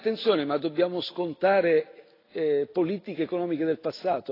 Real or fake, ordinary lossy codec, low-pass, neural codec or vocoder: real; AAC, 24 kbps; 5.4 kHz; none